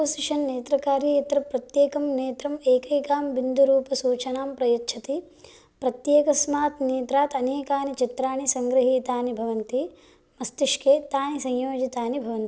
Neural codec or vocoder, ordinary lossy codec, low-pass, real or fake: none; none; none; real